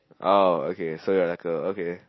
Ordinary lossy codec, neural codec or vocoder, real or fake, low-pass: MP3, 24 kbps; none; real; 7.2 kHz